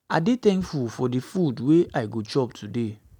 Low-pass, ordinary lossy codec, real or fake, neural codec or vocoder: 19.8 kHz; none; real; none